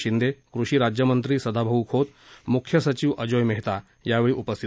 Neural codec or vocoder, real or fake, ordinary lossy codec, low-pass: none; real; none; none